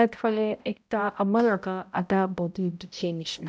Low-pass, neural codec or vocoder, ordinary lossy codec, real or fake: none; codec, 16 kHz, 0.5 kbps, X-Codec, HuBERT features, trained on balanced general audio; none; fake